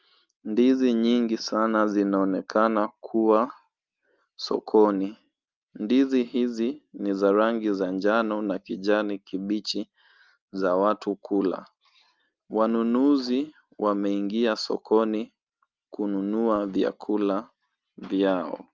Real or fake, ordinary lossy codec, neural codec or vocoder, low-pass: real; Opus, 32 kbps; none; 7.2 kHz